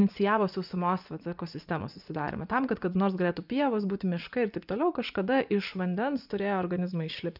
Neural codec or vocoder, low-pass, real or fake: vocoder, 24 kHz, 100 mel bands, Vocos; 5.4 kHz; fake